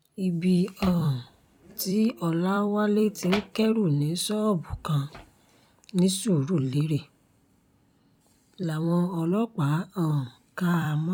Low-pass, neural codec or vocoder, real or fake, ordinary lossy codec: 19.8 kHz; vocoder, 44.1 kHz, 128 mel bands every 256 samples, BigVGAN v2; fake; none